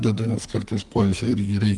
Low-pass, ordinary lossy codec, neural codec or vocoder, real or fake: 10.8 kHz; Opus, 32 kbps; codec, 44.1 kHz, 2.6 kbps, SNAC; fake